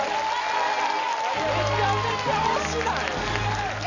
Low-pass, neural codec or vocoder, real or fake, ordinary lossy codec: 7.2 kHz; none; real; none